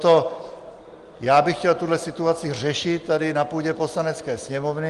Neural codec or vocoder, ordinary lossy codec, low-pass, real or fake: none; Opus, 24 kbps; 10.8 kHz; real